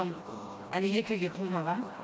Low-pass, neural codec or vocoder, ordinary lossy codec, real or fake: none; codec, 16 kHz, 1 kbps, FreqCodec, smaller model; none; fake